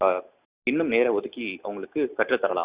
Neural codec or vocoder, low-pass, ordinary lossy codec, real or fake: none; 3.6 kHz; Opus, 64 kbps; real